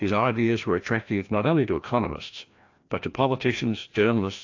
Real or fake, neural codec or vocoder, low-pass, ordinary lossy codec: fake; codec, 16 kHz, 1 kbps, FreqCodec, larger model; 7.2 kHz; AAC, 48 kbps